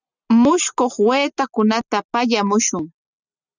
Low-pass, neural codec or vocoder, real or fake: 7.2 kHz; none; real